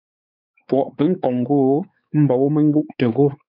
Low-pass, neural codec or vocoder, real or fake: 5.4 kHz; codec, 16 kHz, 4 kbps, X-Codec, WavLM features, trained on Multilingual LibriSpeech; fake